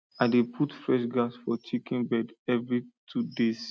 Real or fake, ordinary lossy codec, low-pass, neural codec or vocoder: real; none; none; none